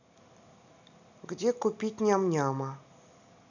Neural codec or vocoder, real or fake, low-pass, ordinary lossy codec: none; real; 7.2 kHz; none